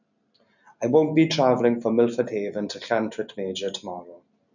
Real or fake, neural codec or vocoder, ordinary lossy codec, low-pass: real; none; none; 7.2 kHz